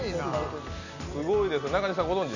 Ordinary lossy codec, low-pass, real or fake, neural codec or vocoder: none; 7.2 kHz; real; none